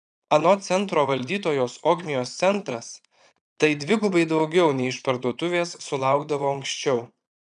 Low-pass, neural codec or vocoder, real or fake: 9.9 kHz; vocoder, 22.05 kHz, 80 mel bands, Vocos; fake